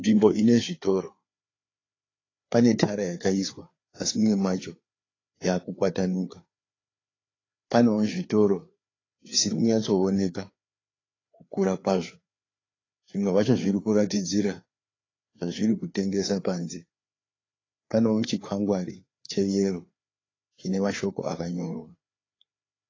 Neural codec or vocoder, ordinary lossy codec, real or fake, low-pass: codec, 16 kHz, 4 kbps, FreqCodec, larger model; AAC, 32 kbps; fake; 7.2 kHz